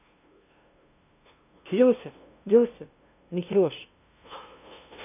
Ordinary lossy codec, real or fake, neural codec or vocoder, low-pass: none; fake; codec, 16 kHz, 0.5 kbps, FunCodec, trained on LibriTTS, 25 frames a second; 3.6 kHz